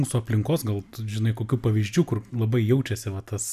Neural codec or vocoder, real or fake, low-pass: none; real; 14.4 kHz